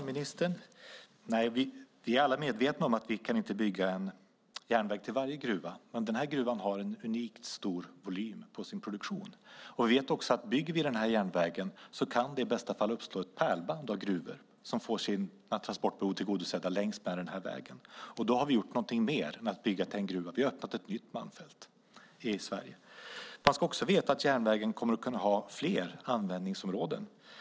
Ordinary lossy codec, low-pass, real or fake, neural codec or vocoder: none; none; real; none